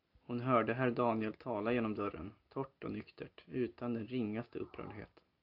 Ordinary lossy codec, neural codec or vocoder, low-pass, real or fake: AAC, 48 kbps; none; 5.4 kHz; real